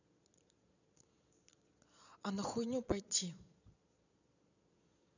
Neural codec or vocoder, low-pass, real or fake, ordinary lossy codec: vocoder, 22.05 kHz, 80 mel bands, Vocos; 7.2 kHz; fake; none